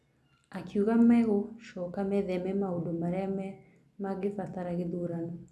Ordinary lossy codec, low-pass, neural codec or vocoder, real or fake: none; none; none; real